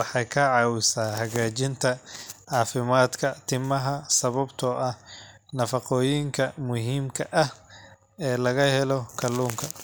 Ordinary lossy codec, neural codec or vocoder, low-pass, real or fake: none; none; none; real